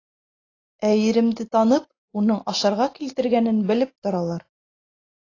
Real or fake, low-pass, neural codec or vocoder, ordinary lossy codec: real; 7.2 kHz; none; AAC, 32 kbps